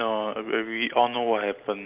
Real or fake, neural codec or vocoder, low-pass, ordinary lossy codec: real; none; 3.6 kHz; Opus, 32 kbps